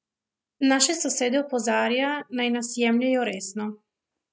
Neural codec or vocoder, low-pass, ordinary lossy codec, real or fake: none; none; none; real